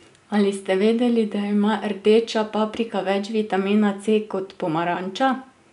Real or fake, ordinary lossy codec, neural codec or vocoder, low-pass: real; none; none; 10.8 kHz